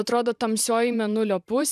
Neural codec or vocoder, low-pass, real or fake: vocoder, 44.1 kHz, 128 mel bands every 512 samples, BigVGAN v2; 14.4 kHz; fake